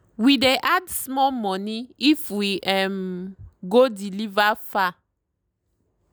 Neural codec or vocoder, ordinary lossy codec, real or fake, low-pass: none; none; real; none